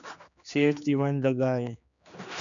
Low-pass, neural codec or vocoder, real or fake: 7.2 kHz; codec, 16 kHz, 2 kbps, X-Codec, HuBERT features, trained on general audio; fake